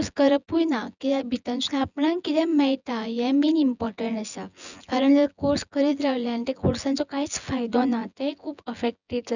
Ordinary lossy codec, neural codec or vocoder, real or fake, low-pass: none; vocoder, 24 kHz, 100 mel bands, Vocos; fake; 7.2 kHz